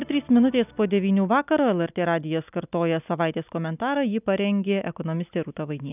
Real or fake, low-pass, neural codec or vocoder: real; 3.6 kHz; none